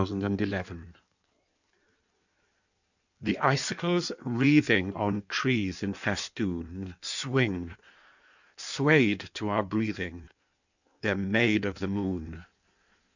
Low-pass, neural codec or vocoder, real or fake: 7.2 kHz; codec, 16 kHz in and 24 kHz out, 1.1 kbps, FireRedTTS-2 codec; fake